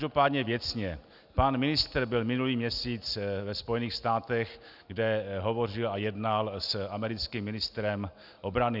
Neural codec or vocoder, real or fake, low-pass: none; real; 5.4 kHz